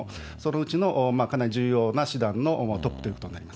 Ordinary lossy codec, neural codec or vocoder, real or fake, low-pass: none; none; real; none